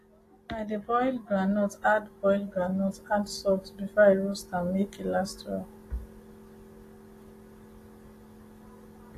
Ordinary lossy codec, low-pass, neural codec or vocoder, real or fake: AAC, 48 kbps; 14.4 kHz; none; real